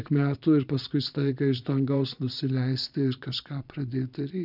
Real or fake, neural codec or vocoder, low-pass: fake; vocoder, 22.05 kHz, 80 mel bands, Vocos; 5.4 kHz